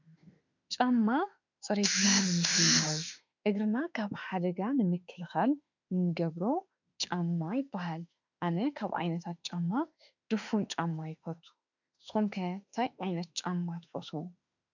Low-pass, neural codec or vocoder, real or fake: 7.2 kHz; autoencoder, 48 kHz, 32 numbers a frame, DAC-VAE, trained on Japanese speech; fake